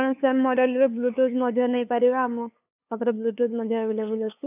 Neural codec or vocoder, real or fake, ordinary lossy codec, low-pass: codec, 16 kHz, 2 kbps, FunCodec, trained on LibriTTS, 25 frames a second; fake; none; 3.6 kHz